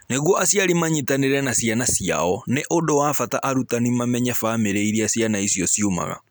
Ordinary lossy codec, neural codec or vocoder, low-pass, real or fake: none; none; none; real